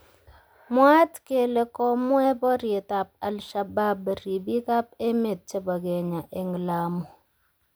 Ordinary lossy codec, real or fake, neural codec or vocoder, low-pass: none; real; none; none